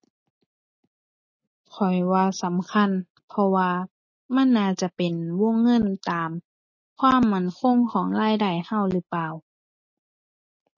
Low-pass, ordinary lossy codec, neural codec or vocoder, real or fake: 7.2 kHz; MP3, 32 kbps; none; real